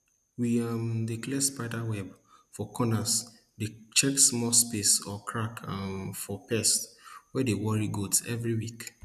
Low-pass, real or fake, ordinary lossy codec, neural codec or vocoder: 14.4 kHz; real; none; none